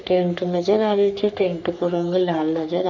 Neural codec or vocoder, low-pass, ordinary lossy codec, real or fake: codec, 44.1 kHz, 3.4 kbps, Pupu-Codec; 7.2 kHz; none; fake